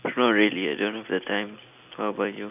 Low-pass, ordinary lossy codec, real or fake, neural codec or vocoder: 3.6 kHz; none; real; none